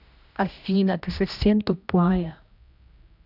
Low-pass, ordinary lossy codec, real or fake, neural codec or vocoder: 5.4 kHz; none; fake; codec, 16 kHz, 1 kbps, X-Codec, HuBERT features, trained on general audio